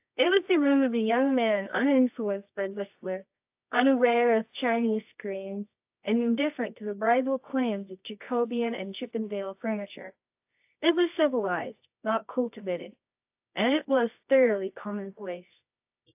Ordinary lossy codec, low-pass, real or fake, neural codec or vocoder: AAC, 32 kbps; 3.6 kHz; fake; codec, 24 kHz, 0.9 kbps, WavTokenizer, medium music audio release